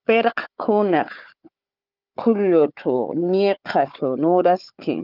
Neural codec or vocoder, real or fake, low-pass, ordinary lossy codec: codec, 16 kHz, 4 kbps, FunCodec, trained on Chinese and English, 50 frames a second; fake; 5.4 kHz; Opus, 24 kbps